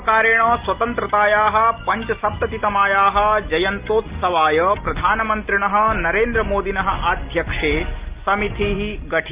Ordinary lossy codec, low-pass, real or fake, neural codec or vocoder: Opus, 32 kbps; 3.6 kHz; real; none